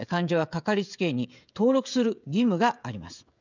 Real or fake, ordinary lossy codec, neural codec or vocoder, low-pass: fake; none; codec, 16 kHz, 16 kbps, FreqCodec, smaller model; 7.2 kHz